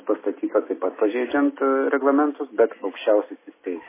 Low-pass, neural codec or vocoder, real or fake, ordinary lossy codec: 3.6 kHz; none; real; MP3, 16 kbps